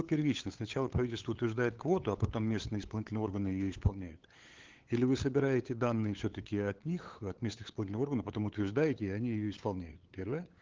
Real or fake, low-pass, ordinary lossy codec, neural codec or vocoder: fake; 7.2 kHz; Opus, 16 kbps; codec, 16 kHz, 8 kbps, FunCodec, trained on LibriTTS, 25 frames a second